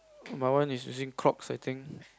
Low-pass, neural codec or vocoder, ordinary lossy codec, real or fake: none; none; none; real